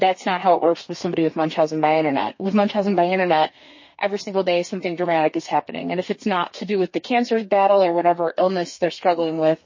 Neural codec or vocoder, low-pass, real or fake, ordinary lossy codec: codec, 32 kHz, 1.9 kbps, SNAC; 7.2 kHz; fake; MP3, 32 kbps